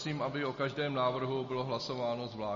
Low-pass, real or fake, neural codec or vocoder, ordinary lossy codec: 7.2 kHz; real; none; MP3, 32 kbps